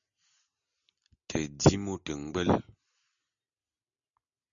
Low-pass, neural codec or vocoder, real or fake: 7.2 kHz; none; real